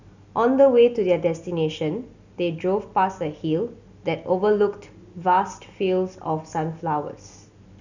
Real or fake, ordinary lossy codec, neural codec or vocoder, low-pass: real; none; none; 7.2 kHz